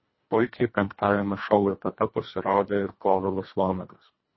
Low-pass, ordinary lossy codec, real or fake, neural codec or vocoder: 7.2 kHz; MP3, 24 kbps; fake; codec, 24 kHz, 1.5 kbps, HILCodec